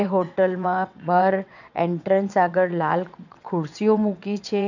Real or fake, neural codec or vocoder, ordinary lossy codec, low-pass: fake; vocoder, 22.05 kHz, 80 mel bands, WaveNeXt; none; 7.2 kHz